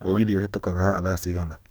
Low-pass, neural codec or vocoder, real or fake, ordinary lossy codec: none; codec, 44.1 kHz, 2.6 kbps, DAC; fake; none